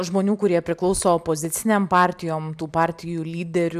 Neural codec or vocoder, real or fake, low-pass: none; real; 14.4 kHz